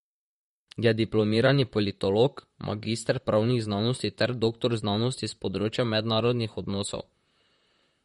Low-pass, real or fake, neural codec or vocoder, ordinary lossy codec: 19.8 kHz; fake; vocoder, 44.1 kHz, 128 mel bands, Pupu-Vocoder; MP3, 48 kbps